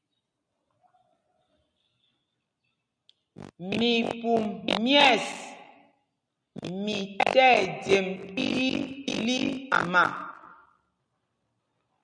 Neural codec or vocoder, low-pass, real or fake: none; 9.9 kHz; real